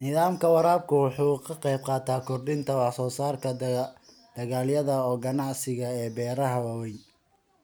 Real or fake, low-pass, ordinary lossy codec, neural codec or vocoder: real; none; none; none